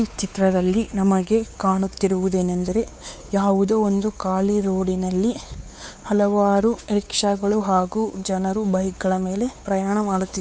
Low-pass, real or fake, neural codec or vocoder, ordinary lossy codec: none; fake; codec, 16 kHz, 4 kbps, X-Codec, WavLM features, trained on Multilingual LibriSpeech; none